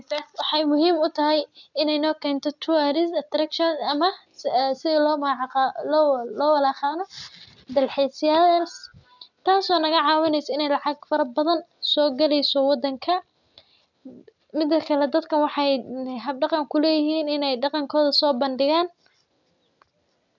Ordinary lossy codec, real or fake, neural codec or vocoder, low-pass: none; real; none; 7.2 kHz